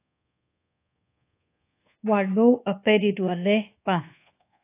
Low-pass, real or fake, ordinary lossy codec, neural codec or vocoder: 3.6 kHz; fake; AAC, 24 kbps; codec, 24 kHz, 1.2 kbps, DualCodec